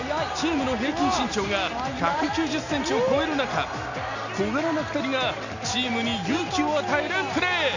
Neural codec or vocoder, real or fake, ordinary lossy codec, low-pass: none; real; none; 7.2 kHz